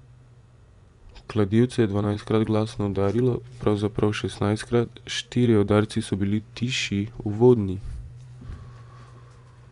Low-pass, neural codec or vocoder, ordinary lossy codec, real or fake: 10.8 kHz; vocoder, 24 kHz, 100 mel bands, Vocos; none; fake